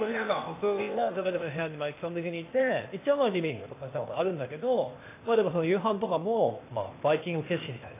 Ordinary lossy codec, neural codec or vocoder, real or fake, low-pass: AAC, 24 kbps; codec, 16 kHz, 0.8 kbps, ZipCodec; fake; 3.6 kHz